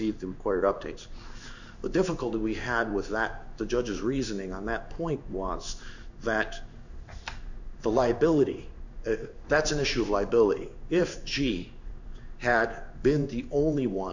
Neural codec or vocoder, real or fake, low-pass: codec, 16 kHz in and 24 kHz out, 1 kbps, XY-Tokenizer; fake; 7.2 kHz